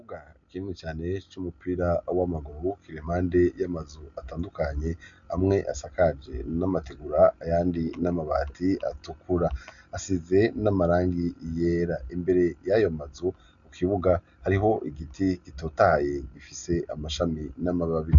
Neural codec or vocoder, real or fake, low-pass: none; real; 7.2 kHz